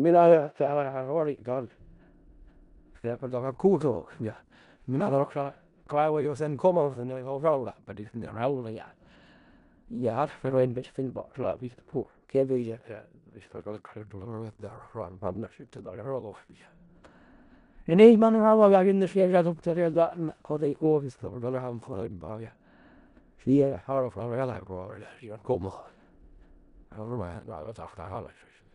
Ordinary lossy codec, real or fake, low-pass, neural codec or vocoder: none; fake; 10.8 kHz; codec, 16 kHz in and 24 kHz out, 0.4 kbps, LongCat-Audio-Codec, four codebook decoder